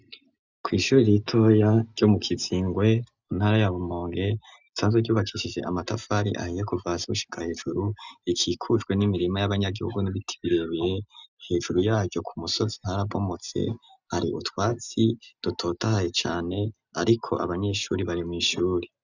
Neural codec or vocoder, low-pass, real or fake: none; 7.2 kHz; real